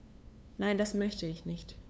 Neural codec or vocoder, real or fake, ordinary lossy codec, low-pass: codec, 16 kHz, 2 kbps, FunCodec, trained on LibriTTS, 25 frames a second; fake; none; none